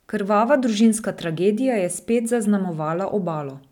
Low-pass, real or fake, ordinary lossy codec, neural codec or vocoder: 19.8 kHz; real; none; none